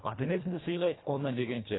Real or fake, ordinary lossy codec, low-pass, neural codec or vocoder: fake; AAC, 16 kbps; 7.2 kHz; codec, 24 kHz, 1.5 kbps, HILCodec